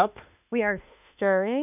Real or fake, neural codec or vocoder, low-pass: fake; autoencoder, 48 kHz, 32 numbers a frame, DAC-VAE, trained on Japanese speech; 3.6 kHz